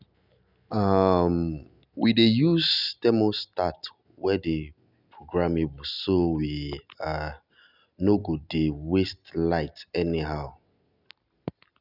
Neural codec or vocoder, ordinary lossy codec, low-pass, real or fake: none; none; 5.4 kHz; real